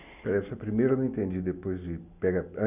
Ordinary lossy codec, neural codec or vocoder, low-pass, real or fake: none; none; 3.6 kHz; real